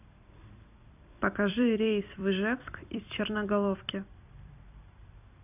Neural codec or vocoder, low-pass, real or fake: vocoder, 44.1 kHz, 128 mel bands every 256 samples, BigVGAN v2; 3.6 kHz; fake